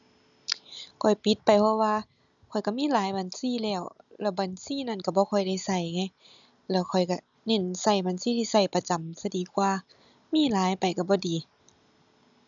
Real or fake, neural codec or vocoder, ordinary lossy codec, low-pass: real; none; none; 7.2 kHz